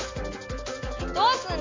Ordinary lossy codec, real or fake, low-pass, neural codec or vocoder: MP3, 48 kbps; real; 7.2 kHz; none